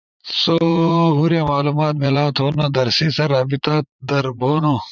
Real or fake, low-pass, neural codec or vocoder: fake; 7.2 kHz; vocoder, 44.1 kHz, 80 mel bands, Vocos